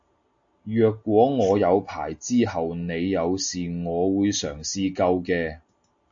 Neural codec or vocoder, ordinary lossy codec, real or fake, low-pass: none; AAC, 64 kbps; real; 7.2 kHz